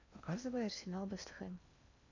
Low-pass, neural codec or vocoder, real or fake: 7.2 kHz; codec, 16 kHz in and 24 kHz out, 0.8 kbps, FocalCodec, streaming, 65536 codes; fake